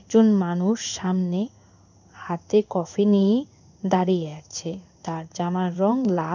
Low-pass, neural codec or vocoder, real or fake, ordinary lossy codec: 7.2 kHz; codec, 16 kHz in and 24 kHz out, 1 kbps, XY-Tokenizer; fake; none